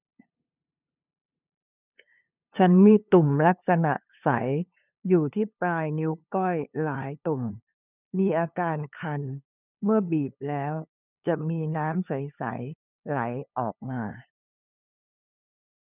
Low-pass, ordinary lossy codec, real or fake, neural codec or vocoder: 3.6 kHz; none; fake; codec, 16 kHz, 2 kbps, FunCodec, trained on LibriTTS, 25 frames a second